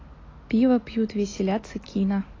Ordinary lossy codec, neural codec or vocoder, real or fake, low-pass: AAC, 32 kbps; none; real; 7.2 kHz